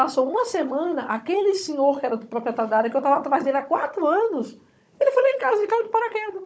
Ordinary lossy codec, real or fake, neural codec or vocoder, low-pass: none; fake; codec, 16 kHz, 16 kbps, FunCodec, trained on Chinese and English, 50 frames a second; none